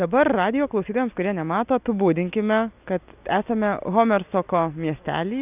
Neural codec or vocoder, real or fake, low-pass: none; real; 3.6 kHz